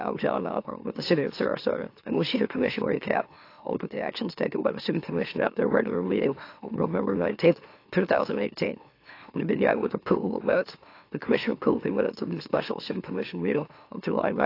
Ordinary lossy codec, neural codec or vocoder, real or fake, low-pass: AAC, 32 kbps; autoencoder, 44.1 kHz, a latent of 192 numbers a frame, MeloTTS; fake; 5.4 kHz